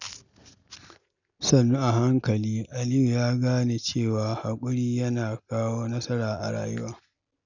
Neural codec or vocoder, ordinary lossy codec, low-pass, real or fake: none; none; 7.2 kHz; real